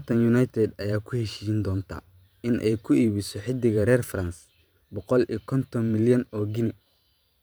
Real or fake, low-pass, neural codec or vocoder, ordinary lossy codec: fake; none; vocoder, 44.1 kHz, 128 mel bands every 512 samples, BigVGAN v2; none